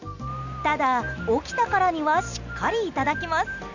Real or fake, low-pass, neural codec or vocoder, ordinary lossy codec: real; 7.2 kHz; none; none